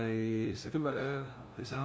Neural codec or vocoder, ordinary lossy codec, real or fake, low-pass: codec, 16 kHz, 0.5 kbps, FunCodec, trained on LibriTTS, 25 frames a second; none; fake; none